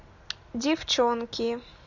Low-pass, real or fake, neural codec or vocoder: 7.2 kHz; real; none